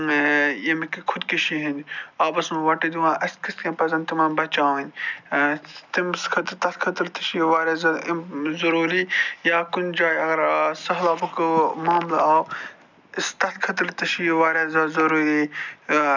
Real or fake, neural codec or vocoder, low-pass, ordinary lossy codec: real; none; 7.2 kHz; none